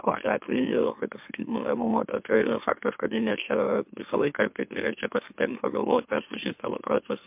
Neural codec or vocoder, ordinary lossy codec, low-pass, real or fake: autoencoder, 44.1 kHz, a latent of 192 numbers a frame, MeloTTS; MP3, 32 kbps; 3.6 kHz; fake